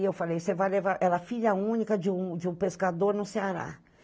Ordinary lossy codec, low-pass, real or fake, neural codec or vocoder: none; none; real; none